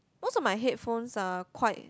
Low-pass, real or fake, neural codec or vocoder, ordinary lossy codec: none; real; none; none